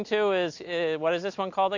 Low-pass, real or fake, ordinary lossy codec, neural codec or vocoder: 7.2 kHz; real; AAC, 48 kbps; none